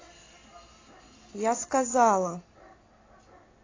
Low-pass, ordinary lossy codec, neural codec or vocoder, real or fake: 7.2 kHz; AAC, 32 kbps; none; real